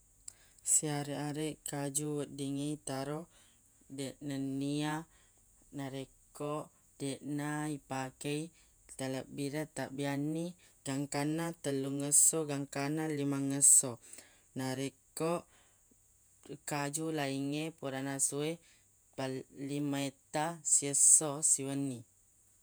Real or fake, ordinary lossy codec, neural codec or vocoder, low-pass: fake; none; vocoder, 48 kHz, 128 mel bands, Vocos; none